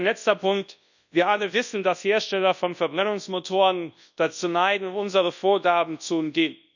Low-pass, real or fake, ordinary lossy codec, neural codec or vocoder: 7.2 kHz; fake; none; codec, 24 kHz, 0.9 kbps, WavTokenizer, large speech release